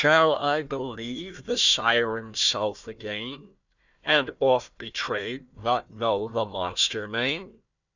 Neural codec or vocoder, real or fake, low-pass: codec, 16 kHz, 1 kbps, FunCodec, trained on Chinese and English, 50 frames a second; fake; 7.2 kHz